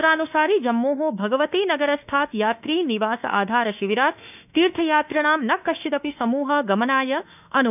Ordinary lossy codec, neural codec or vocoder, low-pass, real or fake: none; autoencoder, 48 kHz, 32 numbers a frame, DAC-VAE, trained on Japanese speech; 3.6 kHz; fake